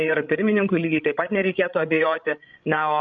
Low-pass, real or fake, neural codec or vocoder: 7.2 kHz; fake; codec, 16 kHz, 16 kbps, FreqCodec, larger model